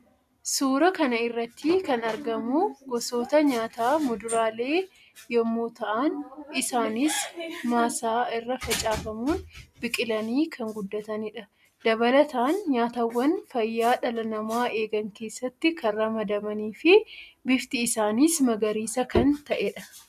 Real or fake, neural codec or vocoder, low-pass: real; none; 14.4 kHz